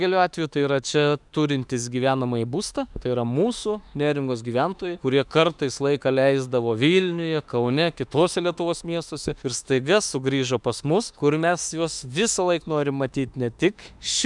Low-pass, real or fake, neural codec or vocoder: 10.8 kHz; fake; autoencoder, 48 kHz, 32 numbers a frame, DAC-VAE, trained on Japanese speech